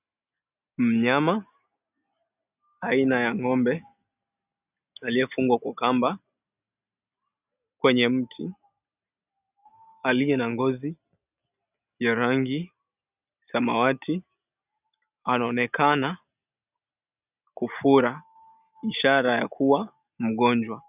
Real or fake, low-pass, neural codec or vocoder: real; 3.6 kHz; none